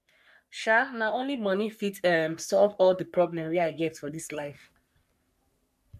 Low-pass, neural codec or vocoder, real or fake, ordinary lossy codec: 14.4 kHz; codec, 44.1 kHz, 3.4 kbps, Pupu-Codec; fake; MP3, 96 kbps